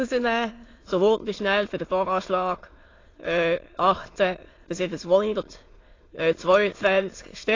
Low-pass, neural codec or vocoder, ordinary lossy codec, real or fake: 7.2 kHz; autoencoder, 22.05 kHz, a latent of 192 numbers a frame, VITS, trained on many speakers; AAC, 32 kbps; fake